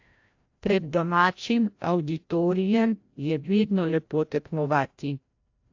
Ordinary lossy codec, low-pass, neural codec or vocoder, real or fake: AAC, 48 kbps; 7.2 kHz; codec, 16 kHz, 0.5 kbps, FreqCodec, larger model; fake